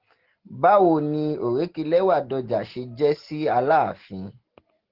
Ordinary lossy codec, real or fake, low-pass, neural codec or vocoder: Opus, 16 kbps; real; 5.4 kHz; none